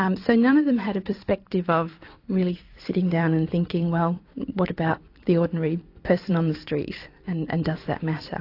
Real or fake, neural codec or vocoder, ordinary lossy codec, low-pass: fake; vocoder, 44.1 kHz, 128 mel bands every 256 samples, BigVGAN v2; AAC, 32 kbps; 5.4 kHz